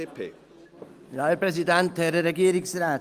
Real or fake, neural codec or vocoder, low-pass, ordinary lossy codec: real; none; 14.4 kHz; Opus, 32 kbps